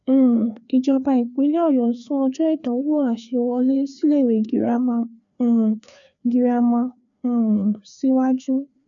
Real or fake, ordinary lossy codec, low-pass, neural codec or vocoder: fake; none; 7.2 kHz; codec, 16 kHz, 2 kbps, FreqCodec, larger model